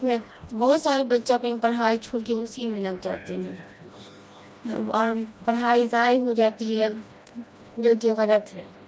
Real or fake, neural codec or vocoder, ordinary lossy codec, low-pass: fake; codec, 16 kHz, 1 kbps, FreqCodec, smaller model; none; none